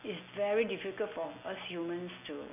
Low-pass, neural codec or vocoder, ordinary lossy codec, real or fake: 3.6 kHz; none; none; real